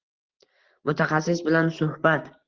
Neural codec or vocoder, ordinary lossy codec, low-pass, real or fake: none; Opus, 16 kbps; 7.2 kHz; real